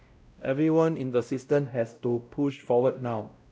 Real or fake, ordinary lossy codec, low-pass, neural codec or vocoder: fake; none; none; codec, 16 kHz, 0.5 kbps, X-Codec, WavLM features, trained on Multilingual LibriSpeech